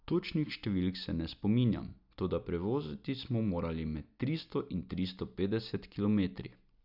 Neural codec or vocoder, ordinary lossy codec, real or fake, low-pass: none; none; real; 5.4 kHz